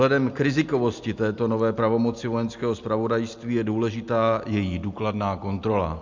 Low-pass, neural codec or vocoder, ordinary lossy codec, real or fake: 7.2 kHz; none; MP3, 64 kbps; real